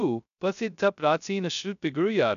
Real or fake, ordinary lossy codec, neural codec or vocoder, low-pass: fake; none; codec, 16 kHz, 0.2 kbps, FocalCodec; 7.2 kHz